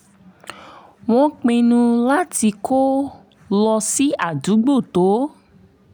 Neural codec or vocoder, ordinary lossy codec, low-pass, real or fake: none; none; none; real